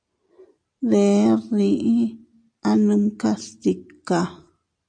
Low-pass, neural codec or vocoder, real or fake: 9.9 kHz; none; real